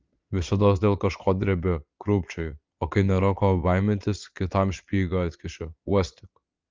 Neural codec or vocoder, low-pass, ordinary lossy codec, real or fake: vocoder, 22.05 kHz, 80 mel bands, Vocos; 7.2 kHz; Opus, 32 kbps; fake